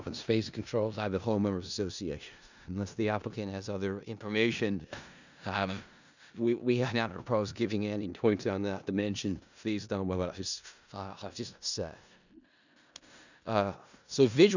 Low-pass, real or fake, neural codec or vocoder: 7.2 kHz; fake; codec, 16 kHz in and 24 kHz out, 0.4 kbps, LongCat-Audio-Codec, four codebook decoder